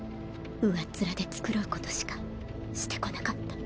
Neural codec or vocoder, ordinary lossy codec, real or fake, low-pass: none; none; real; none